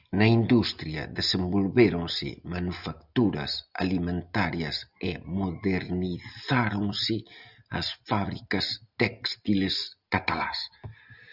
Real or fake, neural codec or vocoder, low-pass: real; none; 5.4 kHz